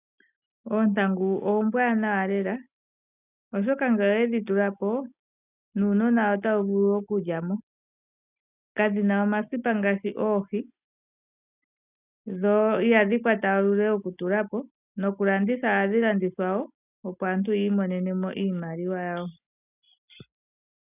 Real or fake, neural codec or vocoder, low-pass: real; none; 3.6 kHz